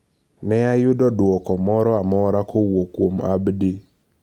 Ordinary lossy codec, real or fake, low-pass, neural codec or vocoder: Opus, 32 kbps; real; 19.8 kHz; none